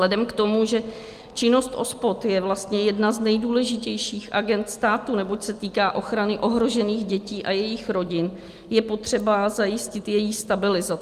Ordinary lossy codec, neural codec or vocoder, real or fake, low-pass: Opus, 32 kbps; none; real; 14.4 kHz